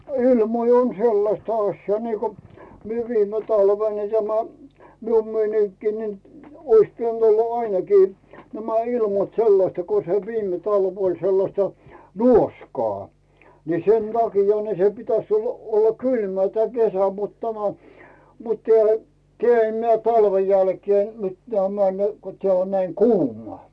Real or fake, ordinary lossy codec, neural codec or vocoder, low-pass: real; none; none; none